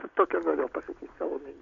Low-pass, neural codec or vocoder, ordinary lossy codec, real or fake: 7.2 kHz; none; MP3, 48 kbps; real